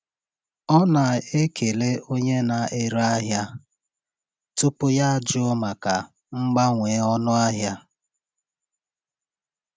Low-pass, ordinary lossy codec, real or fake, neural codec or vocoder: none; none; real; none